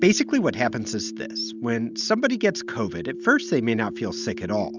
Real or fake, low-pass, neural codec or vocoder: real; 7.2 kHz; none